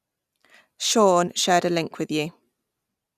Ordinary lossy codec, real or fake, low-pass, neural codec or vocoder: none; real; 14.4 kHz; none